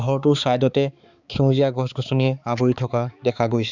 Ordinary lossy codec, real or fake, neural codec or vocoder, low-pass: Opus, 64 kbps; fake; codec, 16 kHz, 4 kbps, X-Codec, HuBERT features, trained on balanced general audio; 7.2 kHz